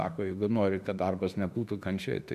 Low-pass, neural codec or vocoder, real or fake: 14.4 kHz; autoencoder, 48 kHz, 32 numbers a frame, DAC-VAE, trained on Japanese speech; fake